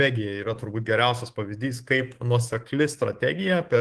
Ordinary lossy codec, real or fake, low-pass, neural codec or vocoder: Opus, 16 kbps; fake; 10.8 kHz; codec, 24 kHz, 3.1 kbps, DualCodec